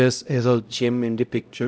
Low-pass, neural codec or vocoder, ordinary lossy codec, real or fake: none; codec, 16 kHz, 0.5 kbps, X-Codec, HuBERT features, trained on LibriSpeech; none; fake